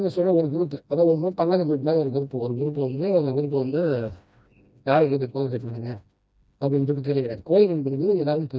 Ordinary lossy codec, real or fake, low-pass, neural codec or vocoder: none; fake; none; codec, 16 kHz, 1 kbps, FreqCodec, smaller model